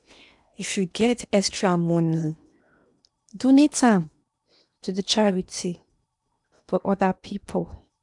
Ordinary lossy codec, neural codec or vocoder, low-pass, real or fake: none; codec, 16 kHz in and 24 kHz out, 0.8 kbps, FocalCodec, streaming, 65536 codes; 10.8 kHz; fake